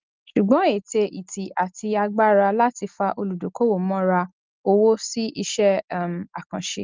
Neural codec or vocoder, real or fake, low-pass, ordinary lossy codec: none; real; 7.2 kHz; Opus, 32 kbps